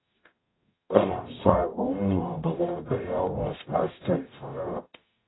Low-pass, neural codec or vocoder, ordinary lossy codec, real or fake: 7.2 kHz; codec, 44.1 kHz, 0.9 kbps, DAC; AAC, 16 kbps; fake